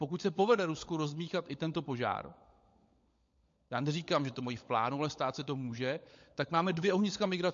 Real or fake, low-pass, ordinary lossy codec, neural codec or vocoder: fake; 7.2 kHz; MP3, 48 kbps; codec, 16 kHz, 16 kbps, FunCodec, trained on LibriTTS, 50 frames a second